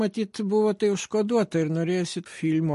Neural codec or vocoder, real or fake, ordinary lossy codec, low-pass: none; real; MP3, 48 kbps; 14.4 kHz